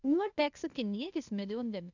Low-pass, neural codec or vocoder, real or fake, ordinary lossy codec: 7.2 kHz; codec, 16 kHz, 0.8 kbps, ZipCodec; fake; none